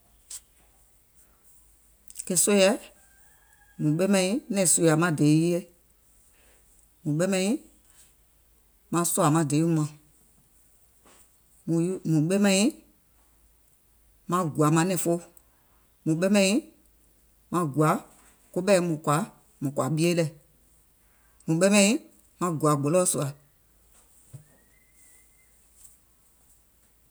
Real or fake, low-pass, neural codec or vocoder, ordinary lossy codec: real; none; none; none